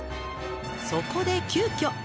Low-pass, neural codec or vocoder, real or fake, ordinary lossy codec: none; none; real; none